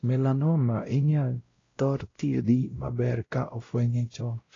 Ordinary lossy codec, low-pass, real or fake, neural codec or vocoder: AAC, 32 kbps; 7.2 kHz; fake; codec, 16 kHz, 0.5 kbps, X-Codec, WavLM features, trained on Multilingual LibriSpeech